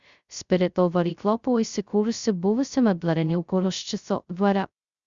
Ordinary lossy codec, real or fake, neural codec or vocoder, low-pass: Opus, 64 kbps; fake; codec, 16 kHz, 0.2 kbps, FocalCodec; 7.2 kHz